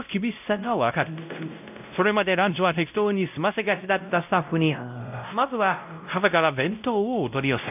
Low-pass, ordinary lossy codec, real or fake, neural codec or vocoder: 3.6 kHz; none; fake; codec, 16 kHz, 0.5 kbps, X-Codec, WavLM features, trained on Multilingual LibriSpeech